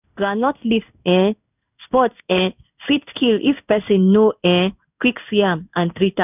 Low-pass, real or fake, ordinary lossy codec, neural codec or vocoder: 3.6 kHz; fake; none; codec, 16 kHz in and 24 kHz out, 1 kbps, XY-Tokenizer